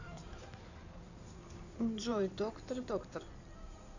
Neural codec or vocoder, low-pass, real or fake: codec, 16 kHz in and 24 kHz out, 2.2 kbps, FireRedTTS-2 codec; 7.2 kHz; fake